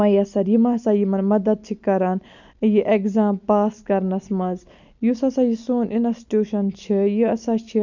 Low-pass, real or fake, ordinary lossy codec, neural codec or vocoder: 7.2 kHz; real; none; none